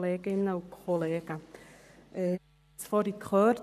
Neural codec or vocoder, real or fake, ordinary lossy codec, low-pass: codec, 44.1 kHz, 7.8 kbps, Pupu-Codec; fake; none; 14.4 kHz